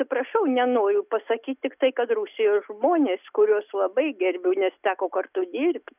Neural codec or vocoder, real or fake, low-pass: none; real; 3.6 kHz